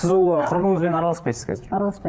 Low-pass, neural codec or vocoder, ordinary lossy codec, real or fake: none; codec, 16 kHz, 4 kbps, FreqCodec, larger model; none; fake